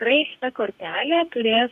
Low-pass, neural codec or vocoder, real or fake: 14.4 kHz; codec, 44.1 kHz, 2.6 kbps, DAC; fake